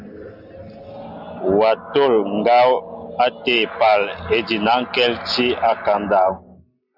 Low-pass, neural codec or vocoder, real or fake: 5.4 kHz; none; real